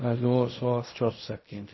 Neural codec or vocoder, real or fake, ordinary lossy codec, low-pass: codec, 16 kHz in and 24 kHz out, 0.4 kbps, LongCat-Audio-Codec, fine tuned four codebook decoder; fake; MP3, 24 kbps; 7.2 kHz